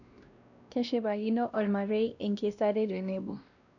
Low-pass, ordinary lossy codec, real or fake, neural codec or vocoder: 7.2 kHz; none; fake; codec, 16 kHz, 1 kbps, X-Codec, WavLM features, trained on Multilingual LibriSpeech